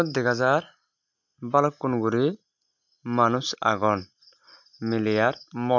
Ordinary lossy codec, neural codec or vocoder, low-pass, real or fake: MP3, 64 kbps; none; 7.2 kHz; real